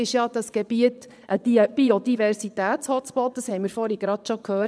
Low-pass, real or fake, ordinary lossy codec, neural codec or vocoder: none; fake; none; vocoder, 22.05 kHz, 80 mel bands, Vocos